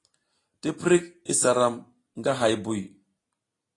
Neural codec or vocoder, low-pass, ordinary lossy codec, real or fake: none; 10.8 kHz; AAC, 32 kbps; real